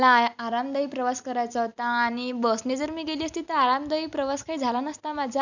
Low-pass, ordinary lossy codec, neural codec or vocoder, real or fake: 7.2 kHz; none; none; real